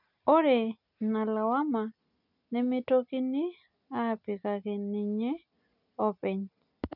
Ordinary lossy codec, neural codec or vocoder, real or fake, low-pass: none; none; real; 5.4 kHz